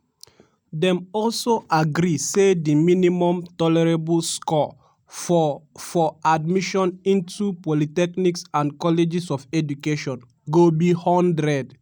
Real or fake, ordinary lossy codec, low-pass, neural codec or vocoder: real; none; none; none